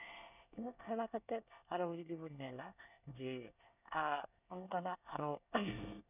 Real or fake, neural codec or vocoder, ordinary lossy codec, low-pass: fake; codec, 24 kHz, 1 kbps, SNAC; none; 3.6 kHz